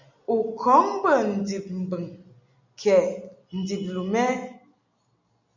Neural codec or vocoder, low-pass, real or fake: none; 7.2 kHz; real